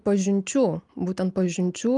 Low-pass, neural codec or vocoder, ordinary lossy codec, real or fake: 10.8 kHz; none; Opus, 32 kbps; real